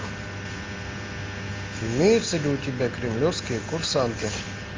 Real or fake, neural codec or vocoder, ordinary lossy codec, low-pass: real; none; Opus, 32 kbps; 7.2 kHz